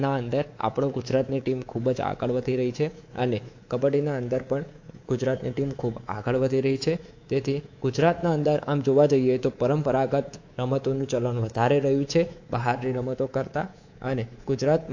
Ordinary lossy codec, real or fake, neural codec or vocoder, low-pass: AAC, 48 kbps; fake; codec, 24 kHz, 3.1 kbps, DualCodec; 7.2 kHz